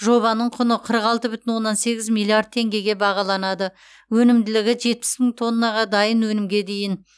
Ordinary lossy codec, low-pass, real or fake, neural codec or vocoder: none; none; real; none